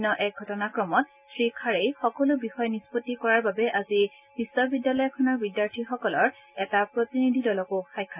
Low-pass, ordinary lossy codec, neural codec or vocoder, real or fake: 3.6 kHz; none; none; real